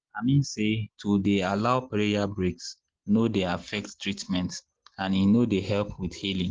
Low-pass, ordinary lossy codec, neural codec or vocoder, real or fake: 7.2 kHz; Opus, 16 kbps; none; real